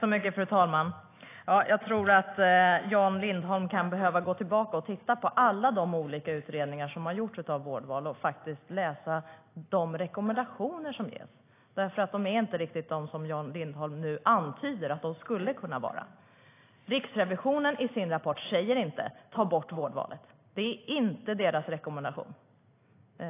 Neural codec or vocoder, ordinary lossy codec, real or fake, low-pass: none; AAC, 24 kbps; real; 3.6 kHz